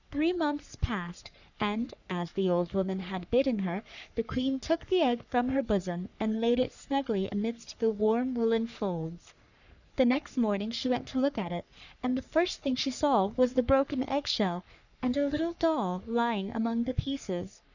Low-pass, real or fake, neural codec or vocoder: 7.2 kHz; fake; codec, 44.1 kHz, 3.4 kbps, Pupu-Codec